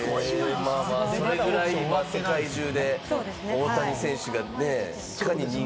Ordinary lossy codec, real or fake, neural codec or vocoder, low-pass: none; real; none; none